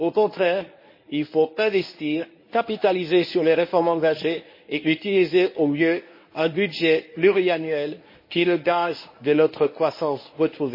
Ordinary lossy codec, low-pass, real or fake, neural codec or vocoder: MP3, 24 kbps; 5.4 kHz; fake; codec, 24 kHz, 0.9 kbps, WavTokenizer, medium speech release version 1